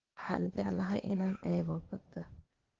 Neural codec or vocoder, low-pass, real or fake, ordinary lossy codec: codec, 16 kHz, 0.8 kbps, ZipCodec; 7.2 kHz; fake; Opus, 16 kbps